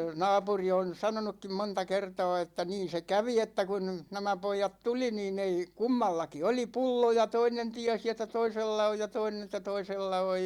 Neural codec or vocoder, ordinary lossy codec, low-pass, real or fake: none; Opus, 64 kbps; 19.8 kHz; real